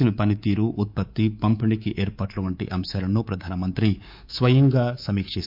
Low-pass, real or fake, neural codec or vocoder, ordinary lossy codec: 5.4 kHz; fake; codec, 24 kHz, 3.1 kbps, DualCodec; MP3, 48 kbps